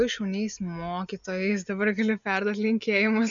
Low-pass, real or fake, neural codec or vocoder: 7.2 kHz; real; none